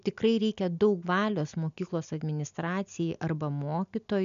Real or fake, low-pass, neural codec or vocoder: real; 7.2 kHz; none